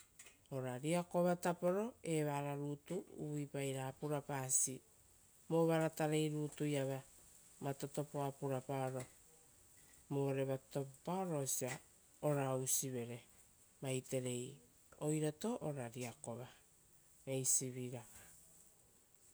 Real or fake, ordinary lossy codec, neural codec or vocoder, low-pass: real; none; none; none